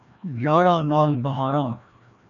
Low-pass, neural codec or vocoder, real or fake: 7.2 kHz; codec, 16 kHz, 1 kbps, FreqCodec, larger model; fake